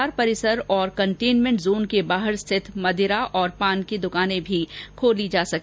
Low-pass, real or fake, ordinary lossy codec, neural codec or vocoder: none; real; none; none